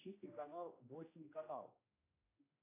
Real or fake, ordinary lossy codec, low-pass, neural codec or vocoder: fake; AAC, 16 kbps; 3.6 kHz; codec, 16 kHz, 1 kbps, X-Codec, HuBERT features, trained on general audio